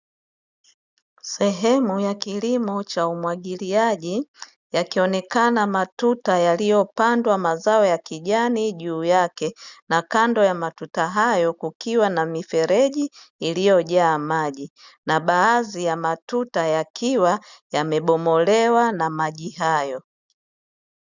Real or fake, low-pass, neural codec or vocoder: real; 7.2 kHz; none